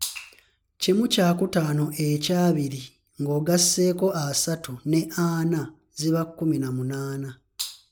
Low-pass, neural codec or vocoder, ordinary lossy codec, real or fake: none; none; none; real